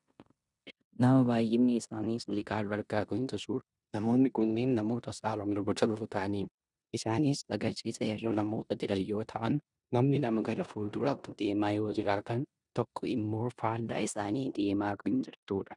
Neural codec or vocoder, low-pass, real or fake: codec, 16 kHz in and 24 kHz out, 0.9 kbps, LongCat-Audio-Codec, four codebook decoder; 10.8 kHz; fake